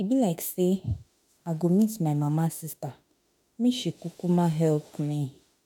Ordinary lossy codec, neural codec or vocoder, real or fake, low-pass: none; autoencoder, 48 kHz, 32 numbers a frame, DAC-VAE, trained on Japanese speech; fake; none